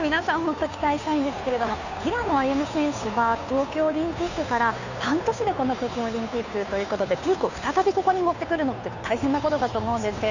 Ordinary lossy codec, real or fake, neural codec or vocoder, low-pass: none; fake; codec, 16 kHz, 2 kbps, FunCodec, trained on Chinese and English, 25 frames a second; 7.2 kHz